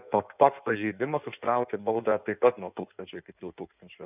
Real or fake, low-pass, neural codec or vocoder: fake; 3.6 kHz; codec, 16 kHz in and 24 kHz out, 1.1 kbps, FireRedTTS-2 codec